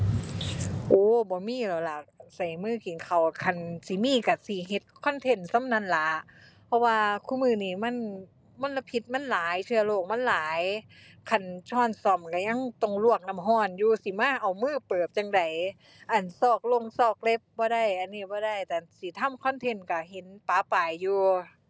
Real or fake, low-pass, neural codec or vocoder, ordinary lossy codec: real; none; none; none